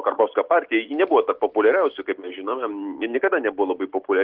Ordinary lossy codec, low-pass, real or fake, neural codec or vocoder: Opus, 16 kbps; 5.4 kHz; real; none